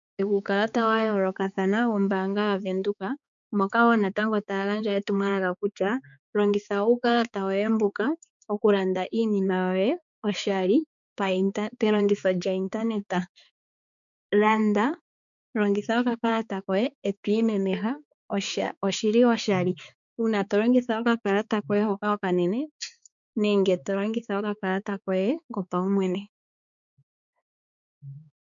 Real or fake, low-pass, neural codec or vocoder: fake; 7.2 kHz; codec, 16 kHz, 4 kbps, X-Codec, HuBERT features, trained on balanced general audio